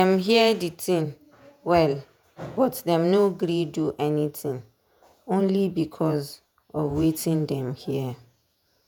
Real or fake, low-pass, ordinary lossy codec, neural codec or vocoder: fake; none; none; vocoder, 48 kHz, 128 mel bands, Vocos